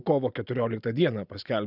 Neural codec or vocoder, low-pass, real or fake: none; 5.4 kHz; real